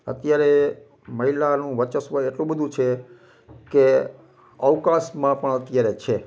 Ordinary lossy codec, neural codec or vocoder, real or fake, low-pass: none; none; real; none